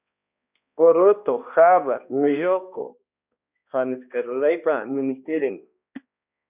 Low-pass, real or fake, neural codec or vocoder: 3.6 kHz; fake; codec, 16 kHz, 1 kbps, X-Codec, HuBERT features, trained on balanced general audio